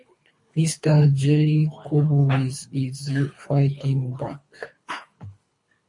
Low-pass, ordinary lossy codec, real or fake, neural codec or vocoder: 10.8 kHz; MP3, 48 kbps; fake; codec, 24 kHz, 3 kbps, HILCodec